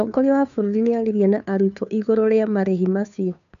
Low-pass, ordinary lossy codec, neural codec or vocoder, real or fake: 7.2 kHz; none; codec, 16 kHz, 2 kbps, FunCodec, trained on Chinese and English, 25 frames a second; fake